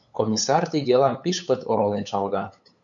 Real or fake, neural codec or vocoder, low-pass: fake; codec, 16 kHz, 8 kbps, FunCodec, trained on LibriTTS, 25 frames a second; 7.2 kHz